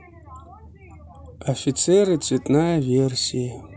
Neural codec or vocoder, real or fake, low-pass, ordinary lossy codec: none; real; none; none